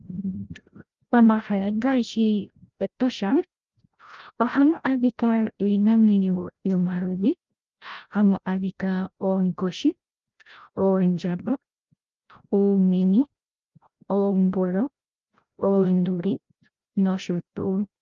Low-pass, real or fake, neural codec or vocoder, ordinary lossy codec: 7.2 kHz; fake; codec, 16 kHz, 0.5 kbps, FreqCodec, larger model; Opus, 32 kbps